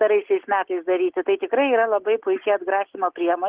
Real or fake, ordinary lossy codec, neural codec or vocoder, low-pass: real; Opus, 16 kbps; none; 3.6 kHz